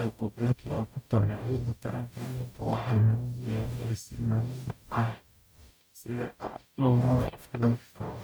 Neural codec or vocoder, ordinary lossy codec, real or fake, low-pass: codec, 44.1 kHz, 0.9 kbps, DAC; none; fake; none